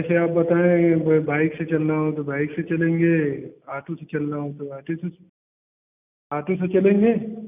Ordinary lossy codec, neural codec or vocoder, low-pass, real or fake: none; none; 3.6 kHz; real